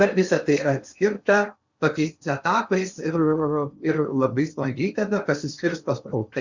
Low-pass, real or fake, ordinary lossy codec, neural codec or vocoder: 7.2 kHz; fake; Opus, 64 kbps; codec, 16 kHz in and 24 kHz out, 0.8 kbps, FocalCodec, streaming, 65536 codes